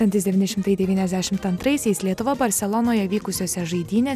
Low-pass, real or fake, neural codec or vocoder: 14.4 kHz; real; none